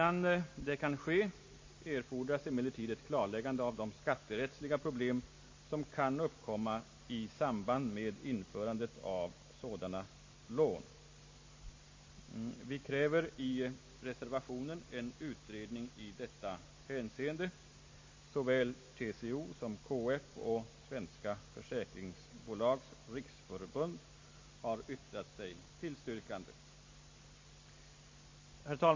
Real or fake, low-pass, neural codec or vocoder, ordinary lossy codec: real; 7.2 kHz; none; MP3, 32 kbps